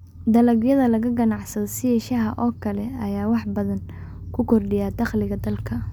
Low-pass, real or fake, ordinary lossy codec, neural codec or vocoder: 19.8 kHz; real; none; none